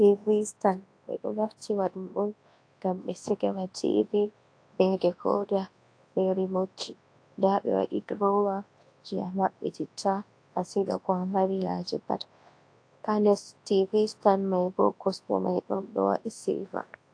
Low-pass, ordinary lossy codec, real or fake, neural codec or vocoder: 9.9 kHz; AAC, 48 kbps; fake; codec, 24 kHz, 0.9 kbps, WavTokenizer, large speech release